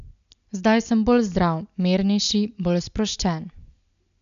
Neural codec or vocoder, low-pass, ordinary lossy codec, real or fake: none; 7.2 kHz; none; real